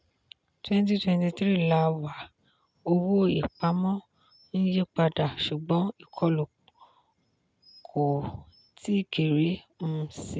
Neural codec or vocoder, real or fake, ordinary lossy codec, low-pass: none; real; none; none